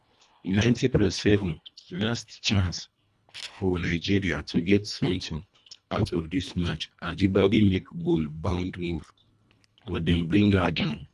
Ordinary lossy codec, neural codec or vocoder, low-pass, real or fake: none; codec, 24 kHz, 1.5 kbps, HILCodec; none; fake